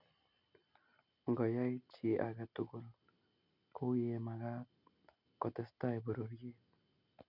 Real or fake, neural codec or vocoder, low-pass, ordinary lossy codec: real; none; 5.4 kHz; none